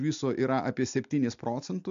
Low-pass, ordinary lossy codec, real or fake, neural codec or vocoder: 7.2 kHz; MP3, 64 kbps; real; none